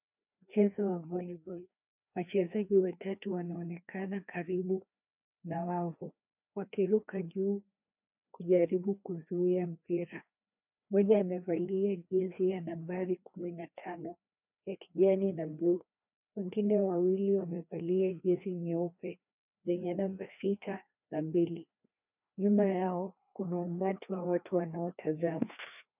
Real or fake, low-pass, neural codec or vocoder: fake; 3.6 kHz; codec, 16 kHz, 2 kbps, FreqCodec, larger model